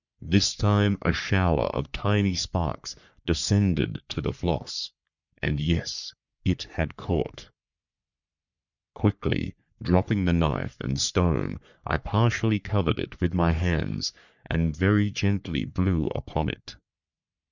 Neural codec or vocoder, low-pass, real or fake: codec, 44.1 kHz, 3.4 kbps, Pupu-Codec; 7.2 kHz; fake